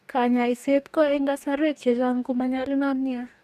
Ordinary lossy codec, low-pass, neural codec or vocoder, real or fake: none; 14.4 kHz; codec, 44.1 kHz, 2.6 kbps, DAC; fake